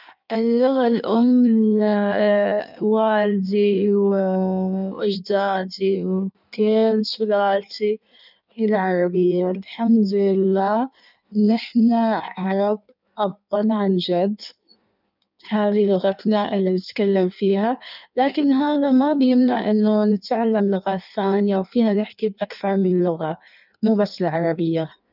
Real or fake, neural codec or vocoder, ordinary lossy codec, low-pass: fake; codec, 16 kHz in and 24 kHz out, 1.1 kbps, FireRedTTS-2 codec; none; 5.4 kHz